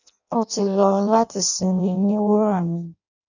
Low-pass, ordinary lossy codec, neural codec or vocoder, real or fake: 7.2 kHz; none; codec, 16 kHz in and 24 kHz out, 0.6 kbps, FireRedTTS-2 codec; fake